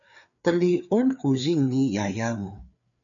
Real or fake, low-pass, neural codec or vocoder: fake; 7.2 kHz; codec, 16 kHz, 4 kbps, FreqCodec, larger model